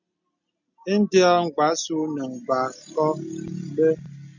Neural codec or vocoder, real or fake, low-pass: none; real; 7.2 kHz